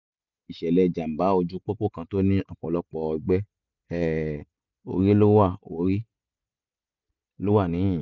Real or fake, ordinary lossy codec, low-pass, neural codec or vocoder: real; none; 7.2 kHz; none